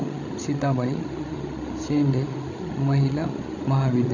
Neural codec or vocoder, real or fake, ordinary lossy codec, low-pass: codec, 16 kHz, 16 kbps, FreqCodec, larger model; fake; none; 7.2 kHz